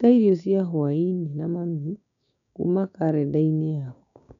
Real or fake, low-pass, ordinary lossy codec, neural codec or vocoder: fake; 7.2 kHz; none; codec, 16 kHz, 6 kbps, DAC